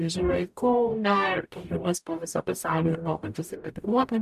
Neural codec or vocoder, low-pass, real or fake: codec, 44.1 kHz, 0.9 kbps, DAC; 14.4 kHz; fake